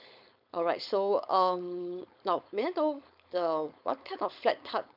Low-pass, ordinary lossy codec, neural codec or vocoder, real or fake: 5.4 kHz; none; codec, 16 kHz, 4.8 kbps, FACodec; fake